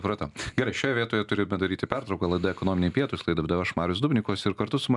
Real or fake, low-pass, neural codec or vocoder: real; 10.8 kHz; none